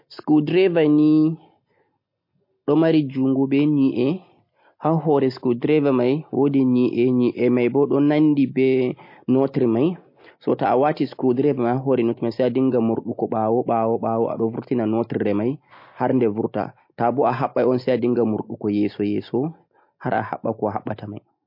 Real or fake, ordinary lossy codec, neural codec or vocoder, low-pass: real; MP3, 32 kbps; none; 5.4 kHz